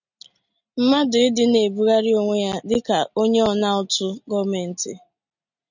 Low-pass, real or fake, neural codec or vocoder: 7.2 kHz; real; none